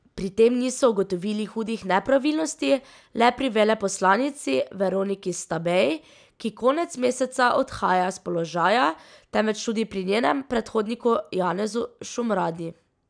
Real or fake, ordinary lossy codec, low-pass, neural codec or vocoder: real; none; 9.9 kHz; none